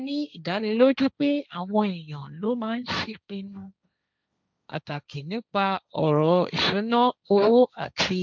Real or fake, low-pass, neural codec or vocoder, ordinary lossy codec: fake; none; codec, 16 kHz, 1.1 kbps, Voila-Tokenizer; none